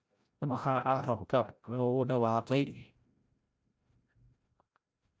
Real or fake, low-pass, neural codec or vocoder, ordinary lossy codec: fake; none; codec, 16 kHz, 0.5 kbps, FreqCodec, larger model; none